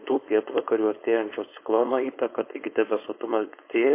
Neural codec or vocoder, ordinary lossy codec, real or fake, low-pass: codec, 16 kHz, 4.8 kbps, FACodec; MP3, 24 kbps; fake; 3.6 kHz